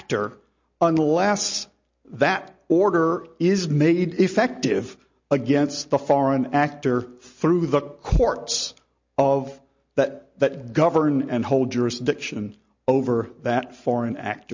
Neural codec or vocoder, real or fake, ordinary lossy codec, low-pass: none; real; MP3, 48 kbps; 7.2 kHz